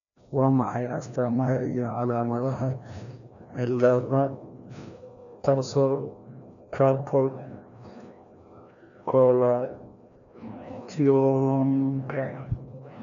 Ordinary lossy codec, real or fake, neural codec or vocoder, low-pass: none; fake; codec, 16 kHz, 1 kbps, FreqCodec, larger model; 7.2 kHz